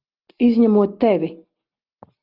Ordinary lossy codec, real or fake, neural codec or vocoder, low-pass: Opus, 32 kbps; real; none; 5.4 kHz